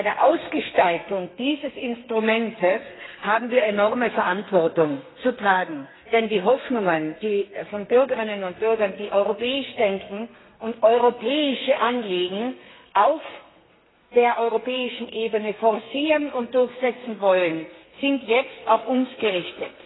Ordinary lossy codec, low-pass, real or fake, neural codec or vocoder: AAC, 16 kbps; 7.2 kHz; fake; codec, 32 kHz, 1.9 kbps, SNAC